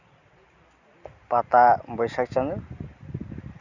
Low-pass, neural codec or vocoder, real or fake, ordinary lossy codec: 7.2 kHz; none; real; none